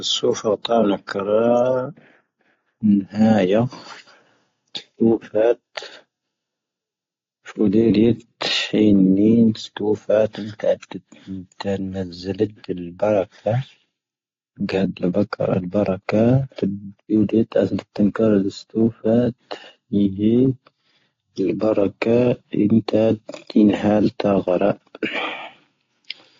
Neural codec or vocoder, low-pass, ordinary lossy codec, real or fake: none; 7.2 kHz; AAC, 32 kbps; real